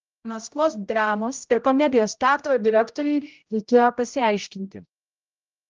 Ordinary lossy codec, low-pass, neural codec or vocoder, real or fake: Opus, 24 kbps; 7.2 kHz; codec, 16 kHz, 0.5 kbps, X-Codec, HuBERT features, trained on general audio; fake